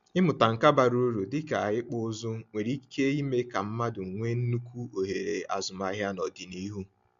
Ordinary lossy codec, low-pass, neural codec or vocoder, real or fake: MP3, 64 kbps; 7.2 kHz; none; real